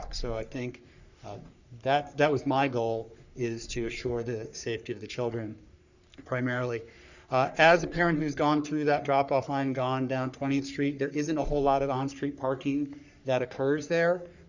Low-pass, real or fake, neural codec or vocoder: 7.2 kHz; fake; codec, 44.1 kHz, 3.4 kbps, Pupu-Codec